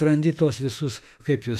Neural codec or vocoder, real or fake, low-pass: autoencoder, 48 kHz, 32 numbers a frame, DAC-VAE, trained on Japanese speech; fake; 14.4 kHz